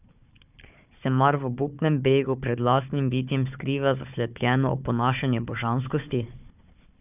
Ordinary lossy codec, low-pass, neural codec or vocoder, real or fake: none; 3.6 kHz; codec, 16 kHz, 4 kbps, FunCodec, trained on Chinese and English, 50 frames a second; fake